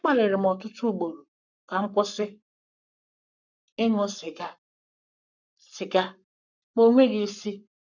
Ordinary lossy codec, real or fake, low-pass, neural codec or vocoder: none; fake; 7.2 kHz; codec, 44.1 kHz, 7.8 kbps, Pupu-Codec